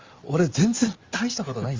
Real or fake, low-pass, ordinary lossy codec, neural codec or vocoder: real; 7.2 kHz; Opus, 32 kbps; none